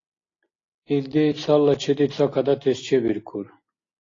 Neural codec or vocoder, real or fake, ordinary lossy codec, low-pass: none; real; AAC, 32 kbps; 7.2 kHz